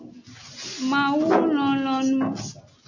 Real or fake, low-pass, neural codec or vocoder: real; 7.2 kHz; none